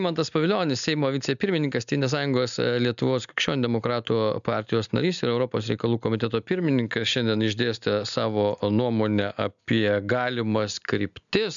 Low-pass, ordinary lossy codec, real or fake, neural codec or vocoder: 7.2 kHz; MP3, 96 kbps; real; none